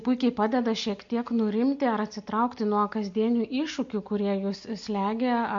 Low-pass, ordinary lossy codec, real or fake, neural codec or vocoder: 7.2 kHz; MP3, 48 kbps; real; none